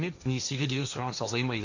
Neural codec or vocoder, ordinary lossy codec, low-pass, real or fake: codec, 16 kHz, 1.1 kbps, Voila-Tokenizer; none; 7.2 kHz; fake